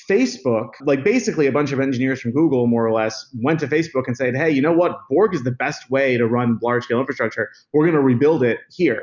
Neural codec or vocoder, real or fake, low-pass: none; real; 7.2 kHz